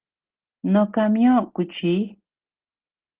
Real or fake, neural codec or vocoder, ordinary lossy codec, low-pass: real; none; Opus, 16 kbps; 3.6 kHz